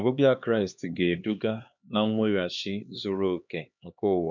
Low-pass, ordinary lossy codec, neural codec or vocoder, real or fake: 7.2 kHz; MP3, 64 kbps; codec, 16 kHz, 2 kbps, X-Codec, HuBERT features, trained on LibriSpeech; fake